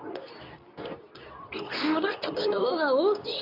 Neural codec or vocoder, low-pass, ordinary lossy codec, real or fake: codec, 24 kHz, 0.9 kbps, WavTokenizer, medium speech release version 2; 5.4 kHz; none; fake